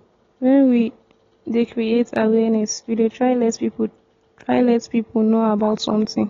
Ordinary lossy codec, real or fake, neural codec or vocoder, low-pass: AAC, 32 kbps; real; none; 7.2 kHz